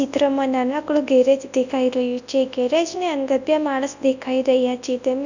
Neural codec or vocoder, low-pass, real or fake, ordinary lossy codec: codec, 24 kHz, 0.9 kbps, WavTokenizer, large speech release; 7.2 kHz; fake; none